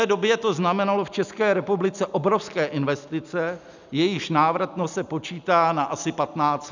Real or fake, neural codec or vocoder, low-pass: real; none; 7.2 kHz